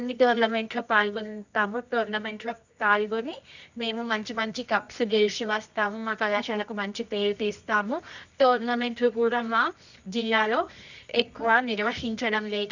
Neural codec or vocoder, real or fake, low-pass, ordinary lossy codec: codec, 24 kHz, 0.9 kbps, WavTokenizer, medium music audio release; fake; 7.2 kHz; none